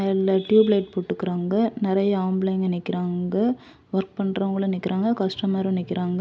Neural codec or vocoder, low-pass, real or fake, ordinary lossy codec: none; none; real; none